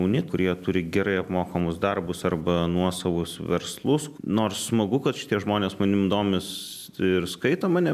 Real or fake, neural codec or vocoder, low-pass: real; none; 14.4 kHz